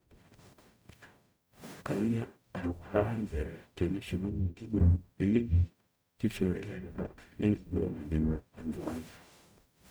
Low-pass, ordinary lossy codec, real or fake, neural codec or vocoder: none; none; fake; codec, 44.1 kHz, 0.9 kbps, DAC